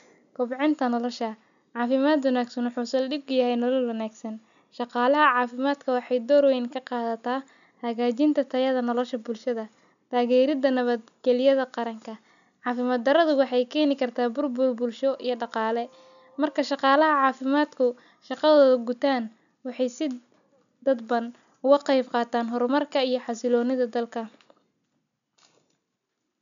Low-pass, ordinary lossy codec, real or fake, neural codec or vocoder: 7.2 kHz; none; real; none